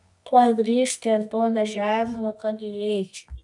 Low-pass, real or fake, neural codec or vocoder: 10.8 kHz; fake; codec, 24 kHz, 0.9 kbps, WavTokenizer, medium music audio release